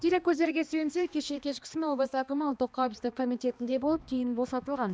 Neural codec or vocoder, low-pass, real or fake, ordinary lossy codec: codec, 16 kHz, 2 kbps, X-Codec, HuBERT features, trained on balanced general audio; none; fake; none